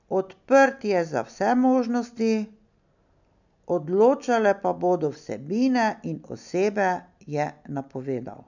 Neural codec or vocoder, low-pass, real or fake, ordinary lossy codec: none; 7.2 kHz; real; none